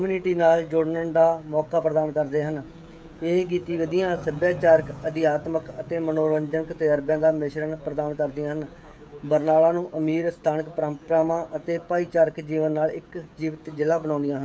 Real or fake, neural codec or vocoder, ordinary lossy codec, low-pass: fake; codec, 16 kHz, 16 kbps, FreqCodec, smaller model; none; none